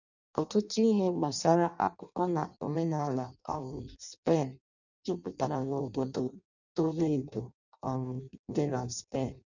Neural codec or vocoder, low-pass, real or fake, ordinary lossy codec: codec, 16 kHz in and 24 kHz out, 0.6 kbps, FireRedTTS-2 codec; 7.2 kHz; fake; none